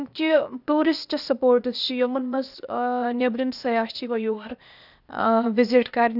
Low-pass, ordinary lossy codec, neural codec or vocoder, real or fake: 5.4 kHz; none; codec, 16 kHz, 0.8 kbps, ZipCodec; fake